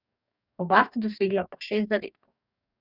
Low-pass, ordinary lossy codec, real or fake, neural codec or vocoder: 5.4 kHz; none; fake; codec, 44.1 kHz, 2.6 kbps, DAC